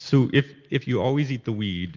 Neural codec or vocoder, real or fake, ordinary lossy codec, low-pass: none; real; Opus, 24 kbps; 7.2 kHz